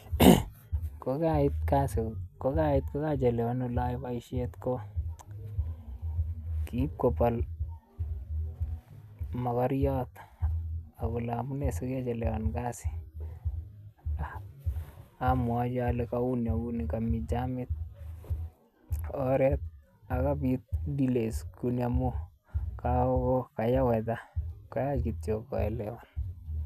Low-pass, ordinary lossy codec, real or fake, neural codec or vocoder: 14.4 kHz; none; real; none